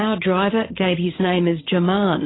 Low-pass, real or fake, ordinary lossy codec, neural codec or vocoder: 7.2 kHz; fake; AAC, 16 kbps; codec, 16 kHz, 8 kbps, FreqCodec, larger model